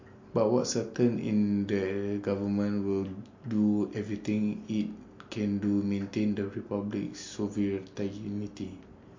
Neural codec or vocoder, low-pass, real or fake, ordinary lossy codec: none; 7.2 kHz; real; MP3, 48 kbps